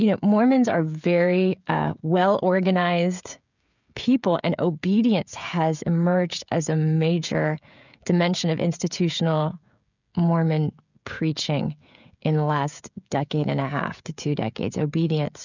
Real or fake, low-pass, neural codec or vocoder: fake; 7.2 kHz; codec, 16 kHz, 16 kbps, FreqCodec, smaller model